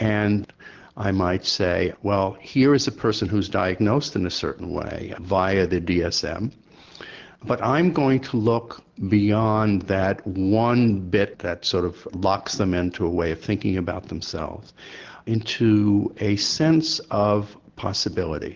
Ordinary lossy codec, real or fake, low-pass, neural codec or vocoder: Opus, 16 kbps; real; 7.2 kHz; none